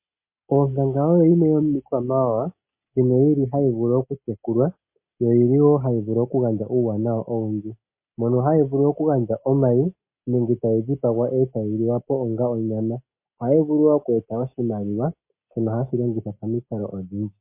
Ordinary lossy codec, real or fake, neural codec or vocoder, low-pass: MP3, 24 kbps; real; none; 3.6 kHz